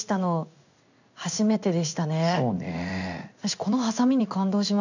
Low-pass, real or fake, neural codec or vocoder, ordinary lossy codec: 7.2 kHz; fake; codec, 16 kHz in and 24 kHz out, 1 kbps, XY-Tokenizer; none